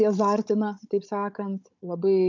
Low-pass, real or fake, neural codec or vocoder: 7.2 kHz; fake; codec, 16 kHz, 16 kbps, FunCodec, trained on Chinese and English, 50 frames a second